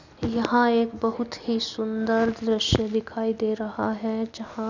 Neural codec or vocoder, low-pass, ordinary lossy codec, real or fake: none; 7.2 kHz; none; real